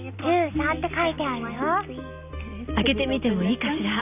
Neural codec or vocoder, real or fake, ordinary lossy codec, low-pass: none; real; none; 3.6 kHz